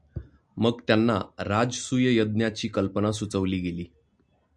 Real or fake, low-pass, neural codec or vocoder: real; 9.9 kHz; none